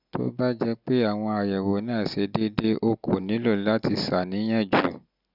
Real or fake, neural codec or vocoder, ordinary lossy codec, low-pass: real; none; none; 5.4 kHz